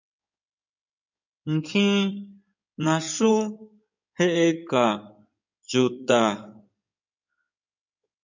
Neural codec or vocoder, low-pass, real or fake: codec, 16 kHz in and 24 kHz out, 2.2 kbps, FireRedTTS-2 codec; 7.2 kHz; fake